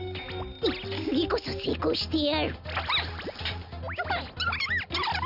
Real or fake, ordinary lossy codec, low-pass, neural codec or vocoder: real; none; 5.4 kHz; none